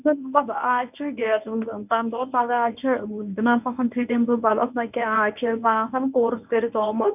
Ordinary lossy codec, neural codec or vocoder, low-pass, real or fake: none; codec, 24 kHz, 0.9 kbps, WavTokenizer, medium speech release version 1; 3.6 kHz; fake